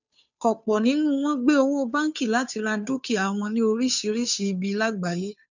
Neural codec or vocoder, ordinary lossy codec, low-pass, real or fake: codec, 16 kHz, 2 kbps, FunCodec, trained on Chinese and English, 25 frames a second; none; 7.2 kHz; fake